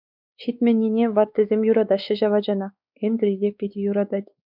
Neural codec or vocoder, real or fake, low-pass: codec, 16 kHz, 4 kbps, X-Codec, WavLM features, trained on Multilingual LibriSpeech; fake; 5.4 kHz